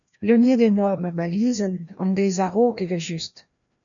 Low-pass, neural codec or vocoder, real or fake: 7.2 kHz; codec, 16 kHz, 1 kbps, FreqCodec, larger model; fake